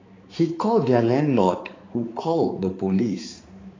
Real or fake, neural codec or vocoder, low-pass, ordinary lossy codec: fake; codec, 16 kHz, 4 kbps, X-Codec, HuBERT features, trained on balanced general audio; 7.2 kHz; AAC, 32 kbps